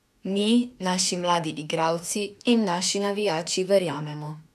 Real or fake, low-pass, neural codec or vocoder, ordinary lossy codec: fake; 14.4 kHz; autoencoder, 48 kHz, 32 numbers a frame, DAC-VAE, trained on Japanese speech; none